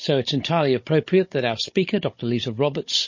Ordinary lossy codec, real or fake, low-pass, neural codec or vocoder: MP3, 32 kbps; fake; 7.2 kHz; codec, 16 kHz, 16 kbps, FunCodec, trained on Chinese and English, 50 frames a second